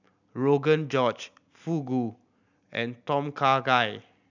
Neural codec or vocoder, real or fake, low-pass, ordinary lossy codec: none; real; 7.2 kHz; none